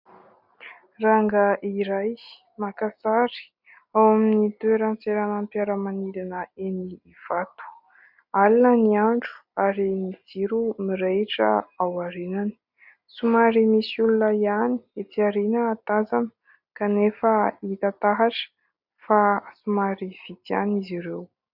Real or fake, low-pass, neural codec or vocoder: real; 5.4 kHz; none